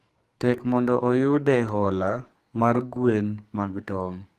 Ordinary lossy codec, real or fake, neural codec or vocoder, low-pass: Opus, 24 kbps; fake; codec, 44.1 kHz, 2.6 kbps, SNAC; 14.4 kHz